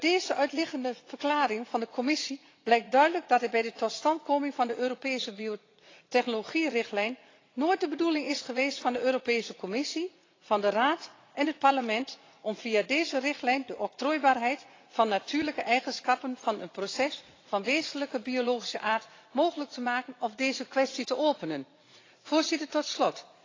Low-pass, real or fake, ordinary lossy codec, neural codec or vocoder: 7.2 kHz; fake; AAC, 32 kbps; vocoder, 44.1 kHz, 80 mel bands, Vocos